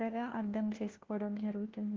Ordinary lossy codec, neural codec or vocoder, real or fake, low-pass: Opus, 16 kbps; codec, 16 kHz, 1 kbps, FunCodec, trained on LibriTTS, 50 frames a second; fake; 7.2 kHz